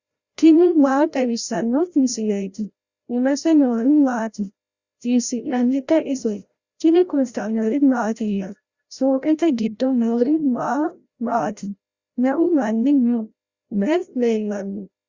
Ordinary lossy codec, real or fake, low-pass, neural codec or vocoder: Opus, 64 kbps; fake; 7.2 kHz; codec, 16 kHz, 0.5 kbps, FreqCodec, larger model